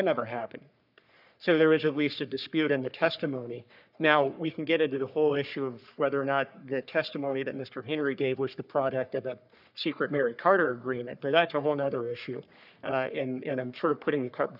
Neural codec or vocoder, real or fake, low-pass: codec, 44.1 kHz, 3.4 kbps, Pupu-Codec; fake; 5.4 kHz